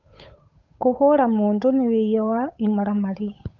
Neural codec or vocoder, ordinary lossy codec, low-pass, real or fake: codec, 16 kHz, 8 kbps, FunCodec, trained on Chinese and English, 25 frames a second; none; 7.2 kHz; fake